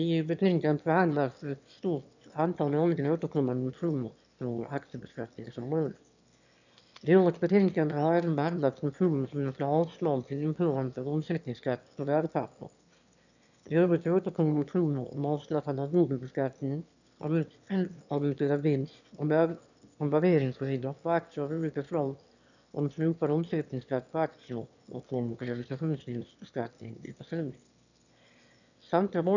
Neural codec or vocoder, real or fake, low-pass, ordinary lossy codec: autoencoder, 22.05 kHz, a latent of 192 numbers a frame, VITS, trained on one speaker; fake; 7.2 kHz; none